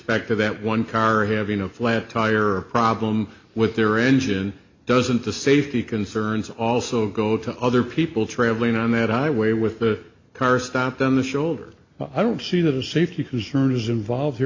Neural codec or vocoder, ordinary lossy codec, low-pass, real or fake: none; MP3, 64 kbps; 7.2 kHz; real